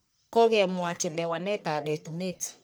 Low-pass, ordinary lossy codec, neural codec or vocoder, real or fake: none; none; codec, 44.1 kHz, 1.7 kbps, Pupu-Codec; fake